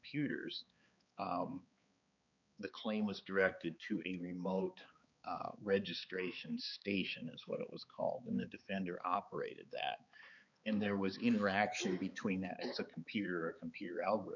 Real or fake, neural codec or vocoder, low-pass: fake; codec, 16 kHz, 4 kbps, X-Codec, HuBERT features, trained on balanced general audio; 7.2 kHz